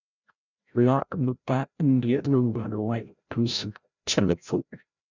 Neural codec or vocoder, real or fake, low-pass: codec, 16 kHz, 0.5 kbps, FreqCodec, larger model; fake; 7.2 kHz